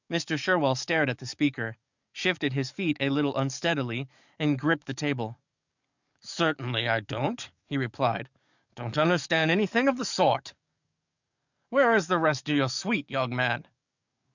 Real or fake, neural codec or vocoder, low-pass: fake; codec, 44.1 kHz, 7.8 kbps, DAC; 7.2 kHz